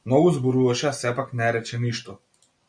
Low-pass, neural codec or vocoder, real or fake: 9.9 kHz; none; real